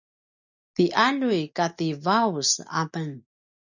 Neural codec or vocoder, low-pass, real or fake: none; 7.2 kHz; real